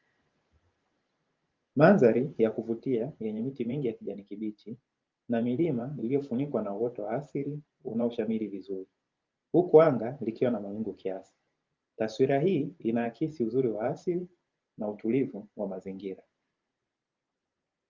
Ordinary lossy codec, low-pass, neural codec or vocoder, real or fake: Opus, 32 kbps; 7.2 kHz; vocoder, 44.1 kHz, 128 mel bands every 512 samples, BigVGAN v2; fake